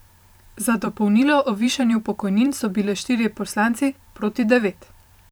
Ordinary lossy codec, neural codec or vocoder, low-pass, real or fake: none; vocoder, 44.1 kHz, 128 mel bands every 512 samples, BigVGAN v2; none; fake